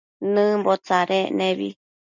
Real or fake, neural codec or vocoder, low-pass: real; none; 7.2 kHz